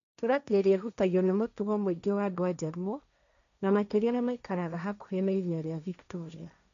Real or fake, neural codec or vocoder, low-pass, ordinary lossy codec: fake; codec, 16 kHz, 1.1 kbps, Voila-Tokenizer; 7.2 kHz; none